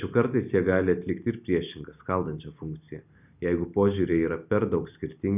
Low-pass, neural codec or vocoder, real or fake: 3.6 kHz; none; real